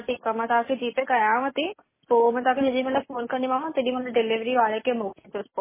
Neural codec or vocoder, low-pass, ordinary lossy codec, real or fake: none; 3.6 kHz; MP3, 16 kbps; real